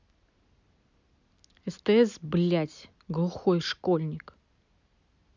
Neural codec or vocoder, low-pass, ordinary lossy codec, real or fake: none; 7.2 kHz; none; real